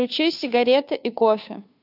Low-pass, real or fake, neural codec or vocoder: 5.4 kHz; fake; codec, 16 kHz, 2 kbps, FunCodec, trained on Chinese and English, 25 frames a second